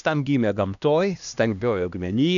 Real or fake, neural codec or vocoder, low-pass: fake; codec, 16 kHz, 1 kbps, X-Codec, HuBERT features, trained on LibriSpeech; 7.2 kHz